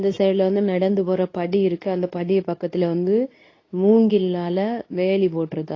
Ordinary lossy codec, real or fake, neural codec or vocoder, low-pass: AAC, 32 kbps; fake; codec, 24 kHz, 0.9 kbps, WavTokenizer, medium speech release version 2; 7.2 kHz